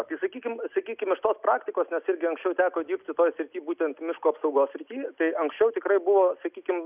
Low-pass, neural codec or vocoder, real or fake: 3.6 kHz; none; real